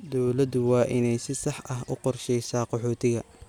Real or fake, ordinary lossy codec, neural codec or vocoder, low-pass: real; none; none; 19.8 kHz